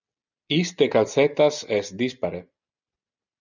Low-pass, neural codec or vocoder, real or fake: 7.2 kHz; none; real